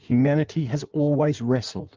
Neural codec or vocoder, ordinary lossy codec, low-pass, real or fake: codec, 16 kHz in and 24 kHz out, 1.1 kbps, FireRedTTS-2 codec; Opus, 32 kbps; 7.2 kHz; fake